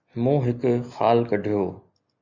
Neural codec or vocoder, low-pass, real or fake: none; 7.2 kHz; real